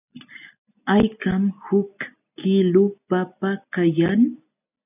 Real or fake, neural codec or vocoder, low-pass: real; none; 3.6 kHz